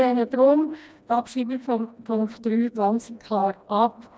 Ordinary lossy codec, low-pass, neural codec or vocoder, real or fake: none; none; codec, 16 kHz, 1 kbps, FreqCodec, smaller model; fake